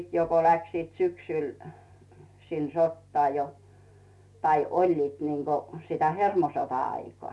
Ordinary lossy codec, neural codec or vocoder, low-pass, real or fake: none; none; none; real